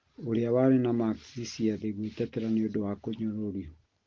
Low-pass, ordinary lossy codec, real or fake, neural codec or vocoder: 7.2 kHz; Opus, 16 kbps; real; none